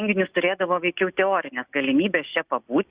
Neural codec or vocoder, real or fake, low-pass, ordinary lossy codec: none; real; 3.6 kHz; Opus, 64 kbps